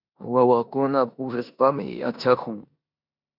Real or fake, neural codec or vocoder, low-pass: fake; codec, 16 kHz in and 24 kHz out, 0.9 kbps, LongCat-Audio-Codec, four codebook decoder; 5.4 kHz